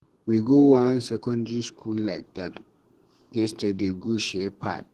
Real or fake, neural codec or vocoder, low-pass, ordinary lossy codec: fake; codec, 32 kHz, 1.9 kbps, SNAC; 14.4 kHz; Opus, 16 kbps